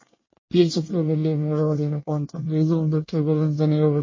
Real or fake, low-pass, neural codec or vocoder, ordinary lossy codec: fake; 7.2 kHz; codec, 24 kHz, 1 kbps, SNAC; MP3, 32 kbps